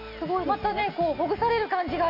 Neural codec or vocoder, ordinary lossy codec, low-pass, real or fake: none; none; 5.4 kHz; real